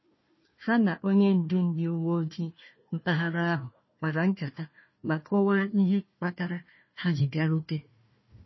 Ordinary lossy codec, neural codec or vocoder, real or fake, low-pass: MP3, 24 kbps; codec, 16 kHz, 1 kbps, FunCodec, trained on Chinese and English, 50 frames a second; fake; 7.2 kHz